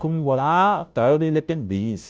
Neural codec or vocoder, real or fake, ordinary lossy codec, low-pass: codec, 16 kHz, 0.5 kbps, FunCodec, trained on Chinese and English, 25 frames a second; fake; none; none